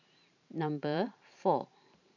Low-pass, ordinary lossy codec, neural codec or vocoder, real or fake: 7.2 kHz; none; vocoder, 44.1 kHz, 128 mel bands every 512 samples, BigVGAN v2; fake